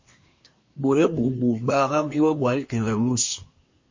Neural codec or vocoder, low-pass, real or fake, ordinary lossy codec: codec, 24 kHz, 1 kbps, SNAC; 7.2 kHz; fake; MP3, 32 kbps